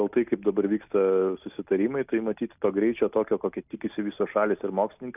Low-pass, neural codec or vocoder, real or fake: 3.6 kHz; none; real